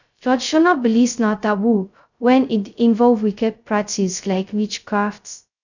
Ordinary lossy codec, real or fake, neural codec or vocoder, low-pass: none; fake; codec, 16 kHz, 0.2 kbps, FocalCodec; 7.2 kHz